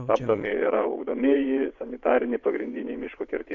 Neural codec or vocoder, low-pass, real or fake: vocoder, 22.05 kHz, 80 mel bands, WaveNeXt; 7.2 kHz; fake